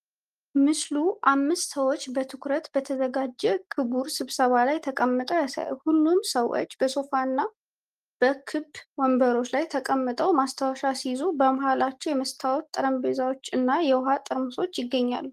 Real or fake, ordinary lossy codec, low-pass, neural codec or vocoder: real; Opus, 24 kbps; 14.4 kHz; none